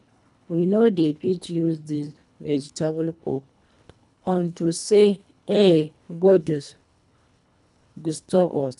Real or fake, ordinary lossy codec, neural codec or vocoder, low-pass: fake; none; codec, 24 kHz, 1.5 kbps, HILCodec; 10.8 kHz